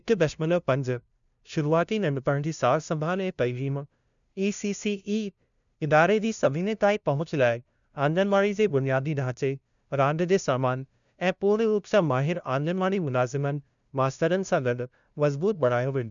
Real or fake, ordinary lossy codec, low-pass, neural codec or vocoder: fake; none; 7.2 kHz; codec, 16 kHz, 0.5 kbps, FunCodec, trained on LibriTTS, 25 frames a second